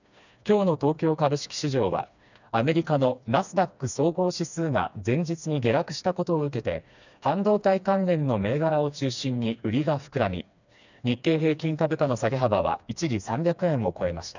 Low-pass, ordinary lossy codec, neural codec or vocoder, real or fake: 7.2 kHz; none; codec, 16 kHz, 2 kbps, FreqCodec, smaller model; fake